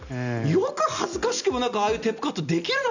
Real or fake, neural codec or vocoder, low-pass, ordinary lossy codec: real; none; 7.2 kHz; none